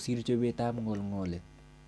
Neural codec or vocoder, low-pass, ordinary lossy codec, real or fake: none; none; none; real